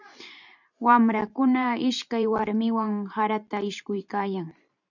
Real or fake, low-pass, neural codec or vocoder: fake; 7.2 kHz; vocoder, 44.1 kHz, 128 mel bands every 512 samples, BigVGAN v2